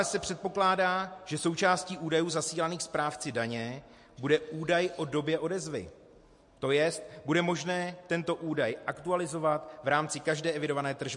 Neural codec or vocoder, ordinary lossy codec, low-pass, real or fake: none; MP3, 48 kbps; 10.8 kHz; real